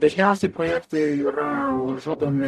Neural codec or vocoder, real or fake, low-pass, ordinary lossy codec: codec, 44.1 kHz, 0.9 kbps, DAC; fake; 14.4 kHz; AAC, 96 kbps